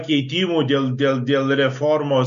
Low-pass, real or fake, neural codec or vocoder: 7.2 kHz; real; none